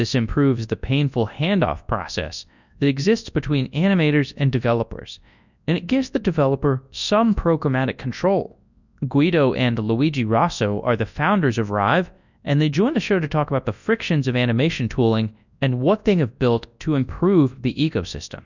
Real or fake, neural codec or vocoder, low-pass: fake; codec, 24 kHz, 0.9 kbps, WavTokenizer, large speech release; 7.2 kHz